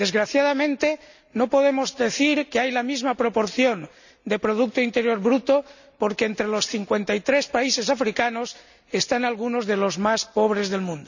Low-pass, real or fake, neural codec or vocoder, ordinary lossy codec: 7.2 kHz; real; none; none